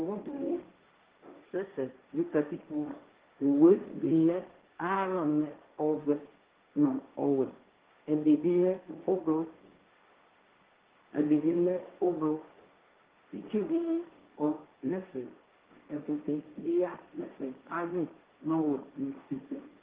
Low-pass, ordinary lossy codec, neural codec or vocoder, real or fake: 3.6 kHz; Opus, 16 kbps; codec, 16 kHz, 1.1 kbps, Voila-Tokenizer; fake